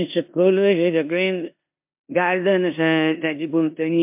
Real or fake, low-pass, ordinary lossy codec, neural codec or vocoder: fake; 3.6 kHz; MP3, 32 kbps; codec, 16 kHz in and 24 kHz out, 0.9 kbps, LongCat-Audio-Codec, four codebook decoder